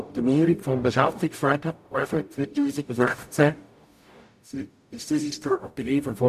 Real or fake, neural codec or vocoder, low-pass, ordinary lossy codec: fake; codec, 44.1 kHz, 0.9 kbps, DAC; 14.4 kHz; none